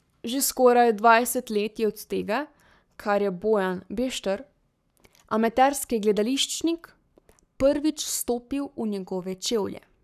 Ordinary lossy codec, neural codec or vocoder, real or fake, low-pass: none; codec, 44.1 kHz, 7.8 kbps, Pupu-Codec; fake; 14.4 kHz